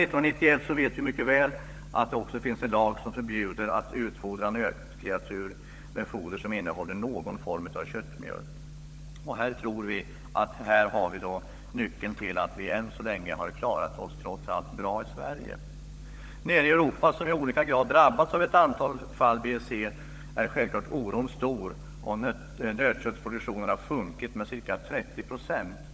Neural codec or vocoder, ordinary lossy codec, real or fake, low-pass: codec, 16 kHz, 16 kbps, FunCodec, trained on LibriTTS, 50 frames a second; none; fake; none